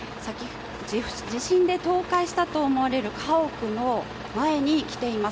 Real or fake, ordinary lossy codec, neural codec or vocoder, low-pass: real; none; none; none